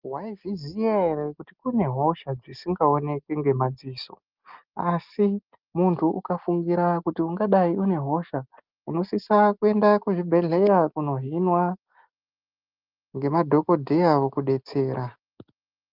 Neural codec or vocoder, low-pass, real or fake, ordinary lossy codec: none; 5.4 kHz; real; Opus, 24 kbps